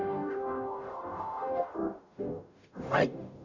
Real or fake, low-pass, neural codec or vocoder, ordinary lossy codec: fake; 7.2 kHz; codec, 44.1 kHz, 0.9 kbps, DAC; none